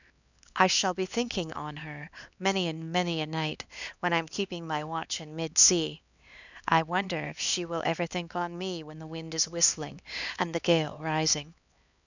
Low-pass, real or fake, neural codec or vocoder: 7.2 kHz; fake; codec, 16 kHz, 2 kbps, X-Codec, HuBERT features, trained on LibriSpeech